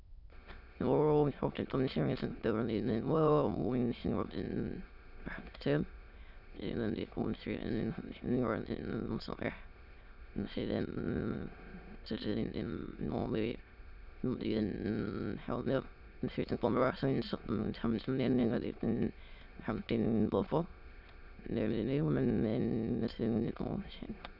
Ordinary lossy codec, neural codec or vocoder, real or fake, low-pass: none; autoencoder, 22.05 kHz, a latent of 192 numbers a frame, VITS, trained on many speakers; fake; 5.4 kHz